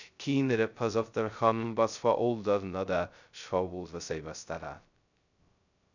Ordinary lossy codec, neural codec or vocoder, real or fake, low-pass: none; codec, 16 kHz, 0.2 kbps, FocalCodec; fake; 7.2 kHz